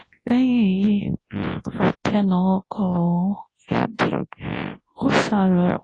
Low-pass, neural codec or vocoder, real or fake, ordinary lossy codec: 10.8 kHz; codec, 24 kHz, 0.9 kbps, WavTokenizer, large speech release; fake; AAC, 32 kbps